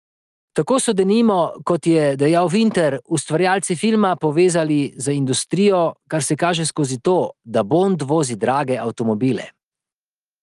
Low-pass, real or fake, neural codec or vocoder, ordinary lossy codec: 10.8 kHz; real; none; Opus, 32 kbps